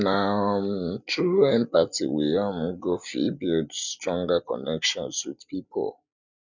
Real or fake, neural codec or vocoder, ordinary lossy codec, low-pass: real; none; none; 7.2 kHz